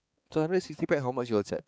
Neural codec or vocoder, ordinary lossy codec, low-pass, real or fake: codec, 16 kHz, 4 kbps, X-Codec, HuBERT features, trained on balanced general audio; none; none; fake